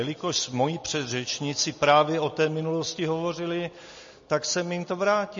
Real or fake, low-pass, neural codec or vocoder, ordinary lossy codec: real; 7.2 kHz; none; MP3, 32 kbps